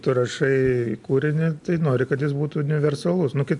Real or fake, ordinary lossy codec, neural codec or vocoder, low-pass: real; MP3, 64 kbps; none; 10.8 kHz